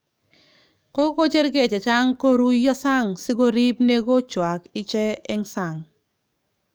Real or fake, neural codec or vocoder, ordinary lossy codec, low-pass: fake; codec, 44.1 kHz, 7.8 kbps, DAC; none; none